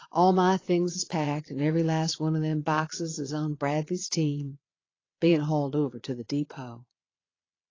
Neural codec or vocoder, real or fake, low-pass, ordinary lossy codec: vocoder, 22.05 kHz, 80 mel bands, Vocos; fake; 7.2 kHz; AAC, 32 kbps